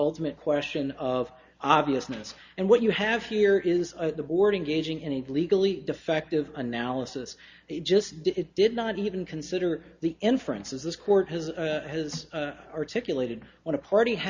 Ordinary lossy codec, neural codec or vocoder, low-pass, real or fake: Opus, 64 kbps; none; 7.2 kHz; real